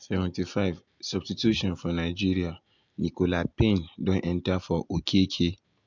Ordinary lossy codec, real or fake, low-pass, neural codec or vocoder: MP3, 64 kbps; real; 7.2 kHz; none